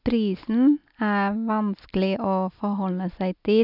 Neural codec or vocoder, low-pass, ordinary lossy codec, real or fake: none; 5.4 kHz; none; real